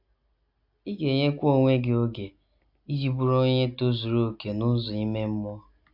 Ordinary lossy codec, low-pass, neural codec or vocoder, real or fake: AAC, 48 kbps; 5.4 kHz; none; real